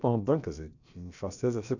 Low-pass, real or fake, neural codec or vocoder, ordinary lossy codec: 7.2 kHz; fake; codec, 16 kHz, about 1 kbps, DyCAST, with the encoder's durations; none